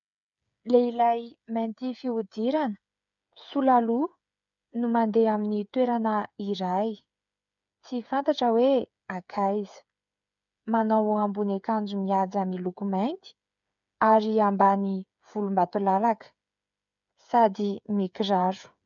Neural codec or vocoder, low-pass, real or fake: codec, 16 kHz, 8 kbps, FreqCodec, smaller model; 7.2 kHz; fake